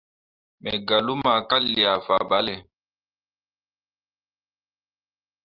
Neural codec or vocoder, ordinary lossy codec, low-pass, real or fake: none; Opus, 24 kbps; 5.4 kHz; real